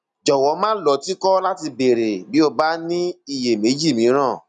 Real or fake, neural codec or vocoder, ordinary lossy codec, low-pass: real; none; none; 10.8 kHz